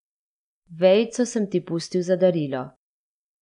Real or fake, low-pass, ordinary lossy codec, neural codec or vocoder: real; 10.8 kHz; none; none